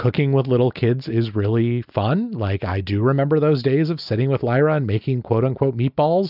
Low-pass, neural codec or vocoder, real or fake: 5.4 kHz; none; real